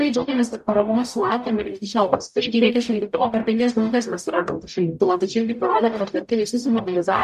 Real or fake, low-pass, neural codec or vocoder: fake; 14.4 kHz; codec, 44.1 kHz, 0.9 kbps, DAC